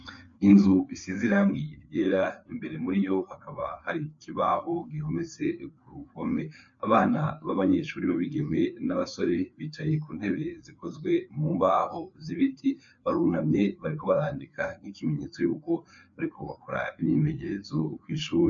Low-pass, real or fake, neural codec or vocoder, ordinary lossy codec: 7.2 kHz; fake; codec, 16 kHz, 4 kbps, FreqCodec, larger model; MP3, 64 kbps